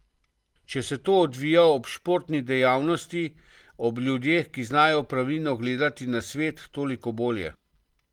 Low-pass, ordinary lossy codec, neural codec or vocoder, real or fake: 19.8 kHz; Opus, 24 kbps; none; real